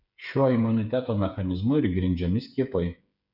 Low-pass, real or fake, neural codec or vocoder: 5.4 kHz; fake; codec, 16 kHz, 8 kbps, FreqCodec, smaller model